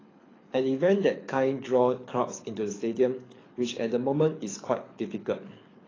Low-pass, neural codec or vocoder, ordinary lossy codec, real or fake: 7.2 kHz; codec, 24 kHz, 6 kbps, HILCodec; AAC, 32 kbps; fake